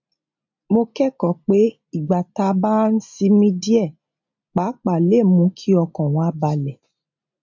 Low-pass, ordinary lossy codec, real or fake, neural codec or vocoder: 7.2 kHz; MP3, 48 kbps; real; none